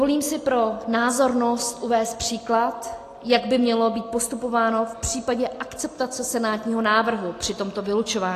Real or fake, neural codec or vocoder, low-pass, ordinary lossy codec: real; none; 14.4 kHz; AAC, 48 kbps